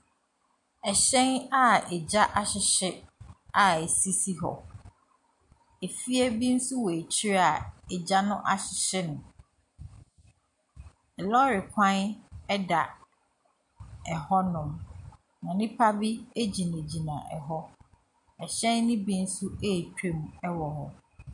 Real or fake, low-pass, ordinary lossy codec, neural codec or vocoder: real; 10.8 kHz; MP3, 64 kbps; none